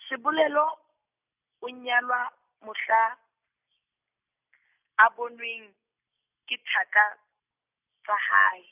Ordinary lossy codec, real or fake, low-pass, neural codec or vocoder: none; real; 3.6 kHz; none